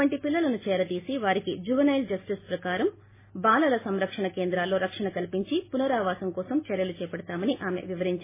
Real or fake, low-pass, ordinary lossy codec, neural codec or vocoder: real; 3.6 kHz; MP3, 16 kbps; none